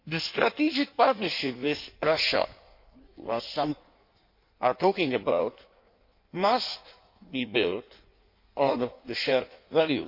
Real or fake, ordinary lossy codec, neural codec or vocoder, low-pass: fake; MP3, 32 kbps; codec, 16 kHz in and 24 kHz out, 1.1 kbps, FireRedTTS-2 codec; 5.4 kHz